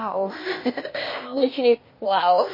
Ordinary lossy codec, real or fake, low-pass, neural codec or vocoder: MP3, 24 kbps; fake; 5.4 kHz; codec, 16 kHz, 0.8 kbps, ZipCodec